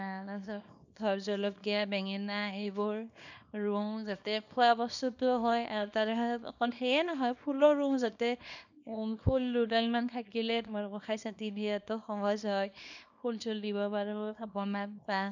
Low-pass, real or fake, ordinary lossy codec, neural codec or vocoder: 7.2 kHz; fake; none; codec, 24 kHz, 0.9 kbps, WavTokenizer, small release